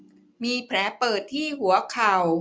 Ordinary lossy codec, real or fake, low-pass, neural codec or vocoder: none; real; none; none